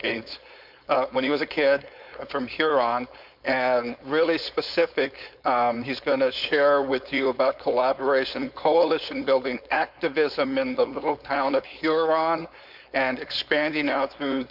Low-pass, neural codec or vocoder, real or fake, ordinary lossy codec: 5.4 kHz; codec, 16 kHz, 4.8 kbps, FACodec; fake; MP3, 48 kbps